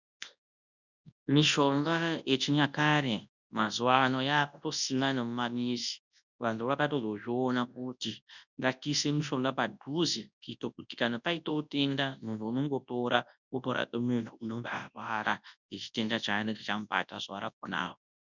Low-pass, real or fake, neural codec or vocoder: 7.2 kHz; fake; codec, 24 kHz, 0.9 kbps, WavTokenizer, large speech release